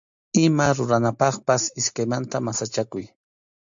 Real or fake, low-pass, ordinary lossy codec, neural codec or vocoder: real; 7.2 kHz; AAC, 64 kbps; none